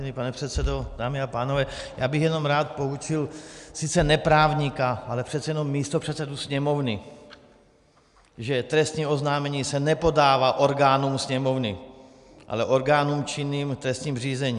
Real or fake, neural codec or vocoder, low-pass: real; none; 10.8 kHz